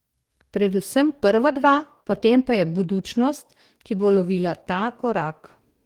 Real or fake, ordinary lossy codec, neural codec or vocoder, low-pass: fake; Opus, 24 kbps; codec, 44.1 kHz, 2.6 kbps, DAC; 19.8 kHz